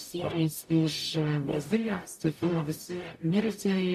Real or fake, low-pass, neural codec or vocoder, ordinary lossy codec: fake; 14.4 kHz; codec, 44.1 kHz, 0.9 kbps, DAC; MP3, 64 kbps